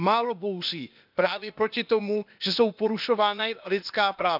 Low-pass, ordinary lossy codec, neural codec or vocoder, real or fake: 5.4 kHz; none; codec, 16 kHz, 0.8 kbps, ZipCodec; fake